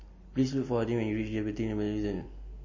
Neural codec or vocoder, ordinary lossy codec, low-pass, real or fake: none; MP3, 32 kbps; 7.2 kHz; real